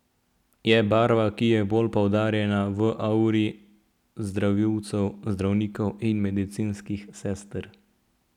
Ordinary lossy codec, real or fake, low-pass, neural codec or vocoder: none; real; 19.8 kHz; none